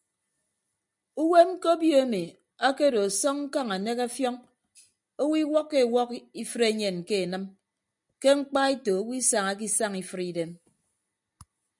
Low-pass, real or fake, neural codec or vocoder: 10.8 kHz; real; none